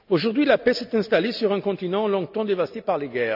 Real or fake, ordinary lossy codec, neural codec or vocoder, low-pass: real; none; none; 5.4 kHz